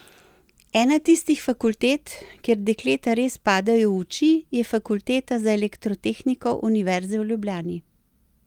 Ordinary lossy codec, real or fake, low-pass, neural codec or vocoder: Opus, 32 kbps; real; 19.8 kHz; none